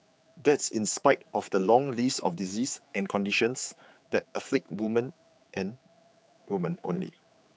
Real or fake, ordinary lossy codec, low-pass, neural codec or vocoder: fake; none; none; codec, 16 kHz, 4 kbps, X-Codec, HuBERT features, trained on general audio